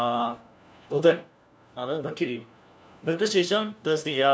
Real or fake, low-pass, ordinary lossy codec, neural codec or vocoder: fake; none; none; codec, 16 kHz, 1 kbps, FunCodec, trained on LibriTTS, 50 frames a second